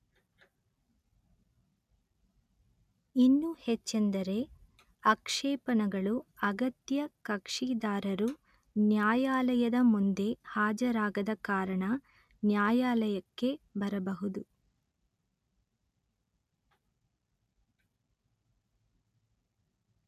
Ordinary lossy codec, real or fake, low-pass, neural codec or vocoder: none; real; 14.4 kHz; none